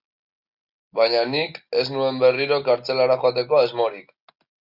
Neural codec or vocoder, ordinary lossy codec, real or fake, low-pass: none; Opus, 64 kbps; real; 5.4 kHz